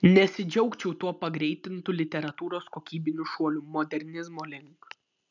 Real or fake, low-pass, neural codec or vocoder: real; 7.2 kHz; none